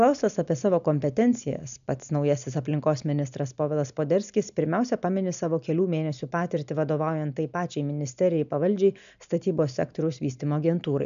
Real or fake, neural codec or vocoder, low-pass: real; none; 7.2 kHz